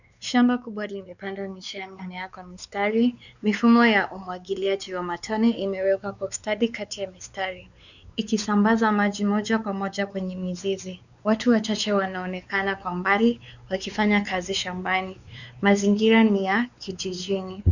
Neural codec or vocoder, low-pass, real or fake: codec, 16 kHz, 4 kbps, X-Codec, WavLM features, trained on Multilingual LibriSpeech; 7.2 kHz; fake